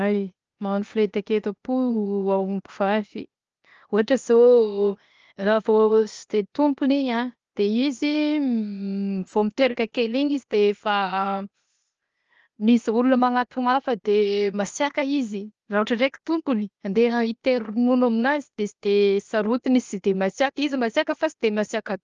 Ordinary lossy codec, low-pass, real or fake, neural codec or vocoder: Opus, 24 kbps; 7.2 kHz; fake; codec, 16 kHz, 0.8 kbps, ZipCodec